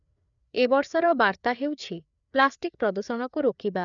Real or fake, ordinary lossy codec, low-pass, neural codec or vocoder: fake; none; 7.2 kHz; codec, 16 kHz, 4 kbps, FreqCodec, larger model